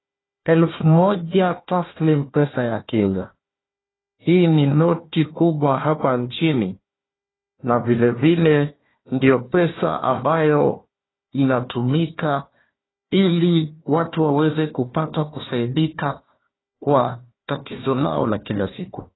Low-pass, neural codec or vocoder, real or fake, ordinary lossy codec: 7.2 kHz; codec, 16 kHz, 1 kbps, FunCodec, trained on Chinese and English, 50 frames a second; fake; AAC, 16 kbps